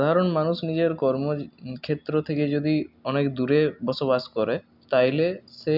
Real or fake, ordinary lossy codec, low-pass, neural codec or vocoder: real; none; 5.4 kHz; none